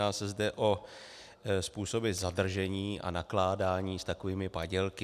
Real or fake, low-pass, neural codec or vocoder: real; 14.4 kHz; none